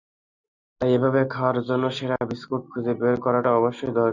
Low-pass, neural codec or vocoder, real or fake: 7.2 kHz; none; real